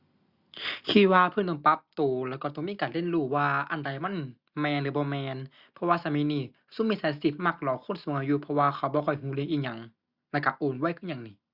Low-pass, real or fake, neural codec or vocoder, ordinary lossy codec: 5.4 kHz; real; none; Opus, 64 kbps